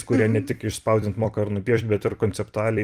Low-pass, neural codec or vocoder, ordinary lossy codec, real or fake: 14.4 kHz; vocoder, 44.1 kHz, 128 mel bands every 256 samples, BigVGAN v2; Opus, 32 kbps; fake